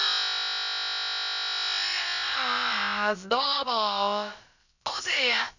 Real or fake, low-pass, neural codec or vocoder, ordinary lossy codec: fake; 7.2 kHz; codec, 16 kHz, about 1 kbps, DyCAST, with the encoder's durations; none